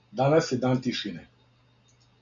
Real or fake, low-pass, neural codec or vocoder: real; 7.2 kHz; none